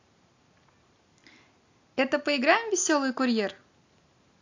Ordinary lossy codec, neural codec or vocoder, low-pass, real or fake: AAC, 48 kbps; none; 7.2 kHz; real